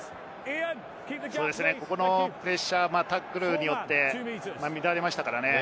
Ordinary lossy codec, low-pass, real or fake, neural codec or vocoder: none; none; real; none